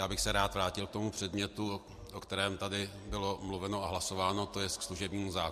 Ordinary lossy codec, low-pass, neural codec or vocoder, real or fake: MP3, 64 kbps; 14.4 kHz; none; real